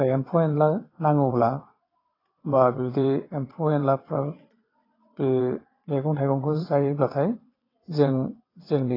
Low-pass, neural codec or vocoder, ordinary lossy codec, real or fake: 5.4 kHz; vocoder, 22.05 kHz, 80 mel bands, WaveNeXt; AAC, 24 kbps; fake